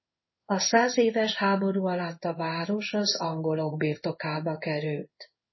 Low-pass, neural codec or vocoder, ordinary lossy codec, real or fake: 7.2 kHz; codec, 16 kHz in and 24 kHz out, 1 kbps, XY-Tokenizer; MP3, 24 kbps; fake